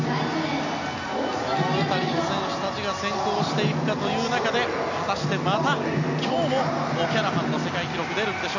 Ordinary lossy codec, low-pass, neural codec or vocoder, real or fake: none; 7.2 kHz; none; real